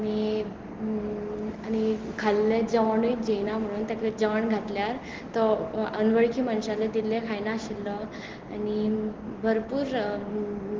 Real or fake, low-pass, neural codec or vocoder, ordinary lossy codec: real; 7.2 kHz; none; Opus, 16 kbps